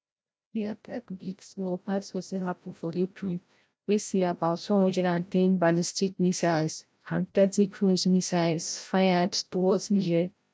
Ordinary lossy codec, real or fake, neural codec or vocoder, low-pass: none; fake; codec, 16 kHz, 0.5 kbps, FreqCodec, larger model; none